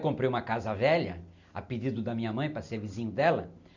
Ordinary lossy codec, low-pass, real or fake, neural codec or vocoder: none; 7.2 kHz; real; none